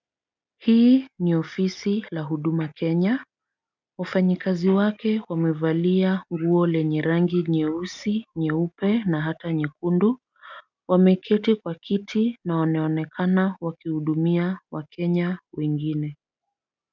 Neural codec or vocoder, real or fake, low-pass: none; real; 7.2 kHz